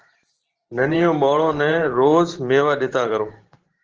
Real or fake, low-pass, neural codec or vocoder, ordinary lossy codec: fake; 7.2 kHz; vocoder, 44.1 kHz, 128 mel bands every 512 samples, BigVGAN v2; Opus, 16 kbps